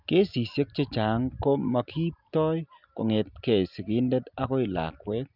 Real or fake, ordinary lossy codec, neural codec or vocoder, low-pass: real; none; none; 5.4 kHz